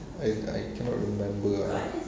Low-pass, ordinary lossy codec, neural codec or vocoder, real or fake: none; none; none; real